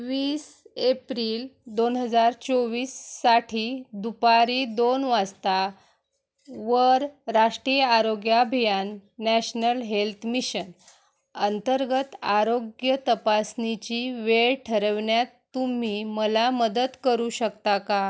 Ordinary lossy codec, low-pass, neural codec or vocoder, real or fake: none; none; none; real